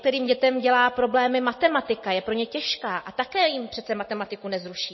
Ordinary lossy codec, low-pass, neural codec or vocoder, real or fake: MP3, 24 kbps; 7.2 kHz; none; real